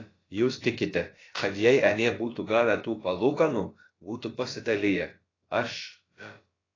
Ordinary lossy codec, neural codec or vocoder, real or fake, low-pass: AAC, 32 kbps; codec, 16 kHz, about 1 kbps, DyCAST, with the encoder's durations; fake; 7.2 kHz